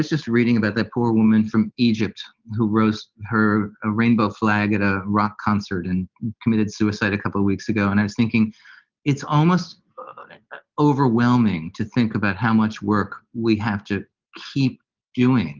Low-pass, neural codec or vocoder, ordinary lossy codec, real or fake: 7.2 kHz; none; Opus, 24 kbps; real